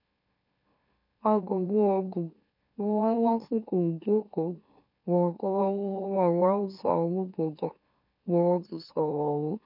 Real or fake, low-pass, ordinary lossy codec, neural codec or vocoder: fake; 5.4 kHz; none; autoencoder, 44.1 kHz, a latent of 192 numbers a frame, MeloTTS